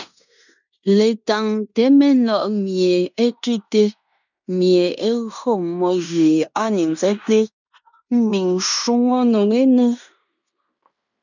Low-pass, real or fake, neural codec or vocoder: 7.2 kHz; fake; codec, 16 kHz in and 24 kHz out, 0.9 kbps, LongCat-Audio-Codec, fine tuned four codebook decoder